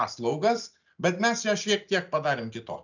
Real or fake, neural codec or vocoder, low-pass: real; none; 7.2 kHz